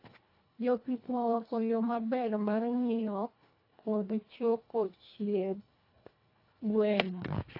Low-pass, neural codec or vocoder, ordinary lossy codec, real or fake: 5.4 kHz; codec, 24 kHz, 1.5 kbps, HILCodec; AAC, 32 kbps; fake